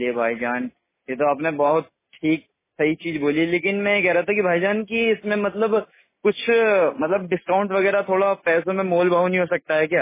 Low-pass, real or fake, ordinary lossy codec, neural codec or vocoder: 3.6 kHz; real; MP3, 16 kbps; none